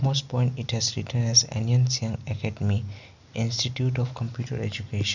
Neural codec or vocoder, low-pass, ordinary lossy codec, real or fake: none; 7.2 kHz; none; real